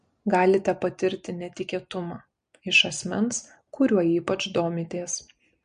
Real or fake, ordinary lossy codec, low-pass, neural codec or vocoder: real; MP3, 48 kbps; 9.9 kHz; none